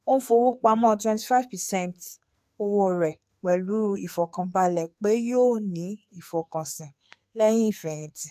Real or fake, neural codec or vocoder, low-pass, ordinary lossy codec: fake; codec, 44.1 kHz, 2.6 kbps, SNAC; 14.4 kHz; AAC, 96 kbps